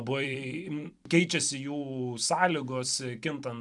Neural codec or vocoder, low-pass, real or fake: vocoder, 44.1 kHz, 128 mel bands every 256 samples, BigVGAN v2; 10.8 kHz; fake